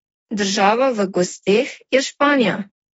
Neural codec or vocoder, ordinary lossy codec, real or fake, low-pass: autoencoder, 48 kHz, 32 numbers a frame, DAC-VAE, trained on Japanese speech; AAC, 24 kbps; fake; 19.8 kHz